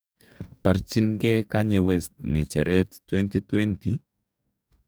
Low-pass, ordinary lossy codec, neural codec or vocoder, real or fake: none; none; codec, 44.1 kHz, 2.6 kbps, DAC; fake